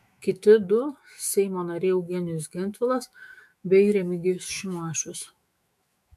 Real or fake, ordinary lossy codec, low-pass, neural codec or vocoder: fake; MP3, 96 kbps; 14.4 kHz; autoencoder, 48 kHz, 128 numbers a frame, DAC-VAE, trained on Japanese speech